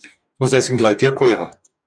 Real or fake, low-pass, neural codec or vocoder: fake; 9.9 kHz; codec, 44.1 kHz, 2.6 kbps, DAC